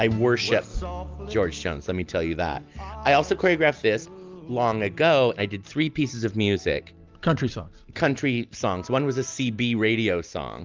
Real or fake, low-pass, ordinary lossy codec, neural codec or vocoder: real; 7.2 kHz; Opus, 24 kbps; none